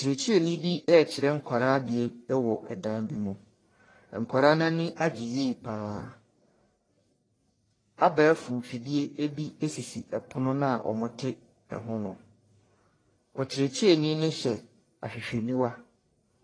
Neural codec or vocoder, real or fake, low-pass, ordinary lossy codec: codec, 44.1 kHz, 1.7 kbps, Pupu-Codec; fake; 9.9 kHz; AAC, 32 kbps